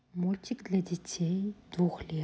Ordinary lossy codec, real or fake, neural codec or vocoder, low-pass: none; real; none; none